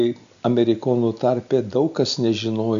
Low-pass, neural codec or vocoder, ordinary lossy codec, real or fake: 7.2 kHz; none; AAC, 96 kbps; real